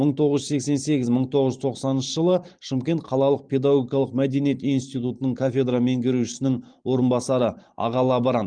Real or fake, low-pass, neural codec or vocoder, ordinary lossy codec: fake; 9.9 kHz; vocoder, 44.1 kHz, 128 mel bands every 512 samples, BigVGAN v2; Opus, 24 kbps